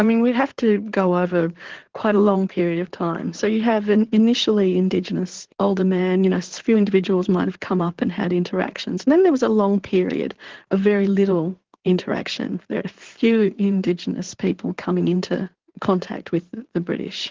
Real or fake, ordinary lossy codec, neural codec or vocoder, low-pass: fake; Opus, 16 kbps; codec, 16 kHz in and 24 kHz out, 2.2 kbps, FireRedTTS-2 codec; 7.2 kHz